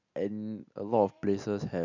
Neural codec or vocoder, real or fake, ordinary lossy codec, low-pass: none; real; none; 7.2 kHz